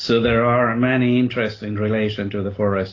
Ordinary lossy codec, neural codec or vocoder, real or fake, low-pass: AAC, 32 kbps; none; real; 7.2 kHz